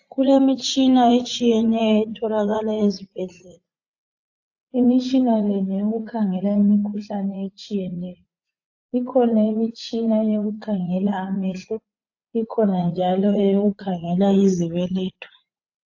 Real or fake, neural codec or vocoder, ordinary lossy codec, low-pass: fake; vocoder, 44.1 kHz, 80 mel bands, Vocos; AAC, 48 kbps; 7.2 kHz